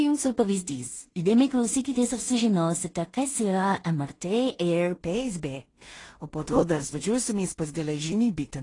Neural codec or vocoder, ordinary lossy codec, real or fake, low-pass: codec, 16 kHz in and 24 kHz out, 0.4 kbps, LongCat-Audio-Codec, two codebook decoder; AAC, 32 kbps; fake; 10.8 kHz